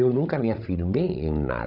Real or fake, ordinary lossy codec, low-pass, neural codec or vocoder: fake; none; 5.4 kHz; codec, 16 kHz, 16 kbps, FreqCodec, larger model